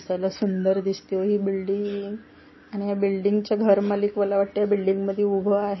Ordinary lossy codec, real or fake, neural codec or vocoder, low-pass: MP3, 24 kbps; real; none; 7.2 kHz